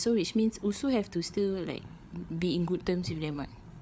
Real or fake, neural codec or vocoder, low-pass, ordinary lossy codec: fake; codec, 16 kHz, 8 kbps, FreqCodec, larger model; none; none